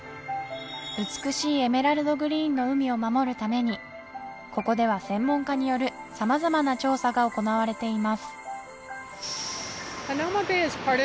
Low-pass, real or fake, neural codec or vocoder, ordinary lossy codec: none; real; none; none